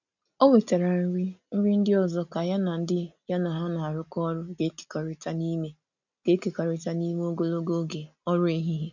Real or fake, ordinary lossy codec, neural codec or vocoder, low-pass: real; none; none; 7.2 kHz